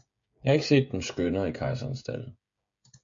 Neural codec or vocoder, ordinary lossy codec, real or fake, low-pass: codec, 16 kHz, 16 kbps, FreqCodec, smaller model; MP3, 48 kbps; fake; 7.2 kHz